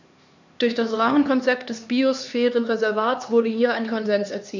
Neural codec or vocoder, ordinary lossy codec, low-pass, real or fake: codec, 16 kHz, 2 kbps, X-Codec, HuBERT features, trained on LibriSpeech; none; 7.2 kHz; fake